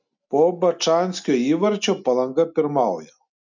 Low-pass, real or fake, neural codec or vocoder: 7.2 kHz; real; none